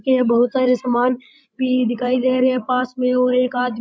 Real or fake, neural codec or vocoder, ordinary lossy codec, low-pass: fake; codec, 16 kHz, 16 kbps, FreqCodec, larger model; none; none